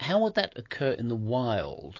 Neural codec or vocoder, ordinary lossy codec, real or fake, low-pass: autoencoder, 48 kHz, 128 numbers a frame, DAC-VAE, trained on Japanese speech; AAC, 32 kbps; fake; 7.2 kHz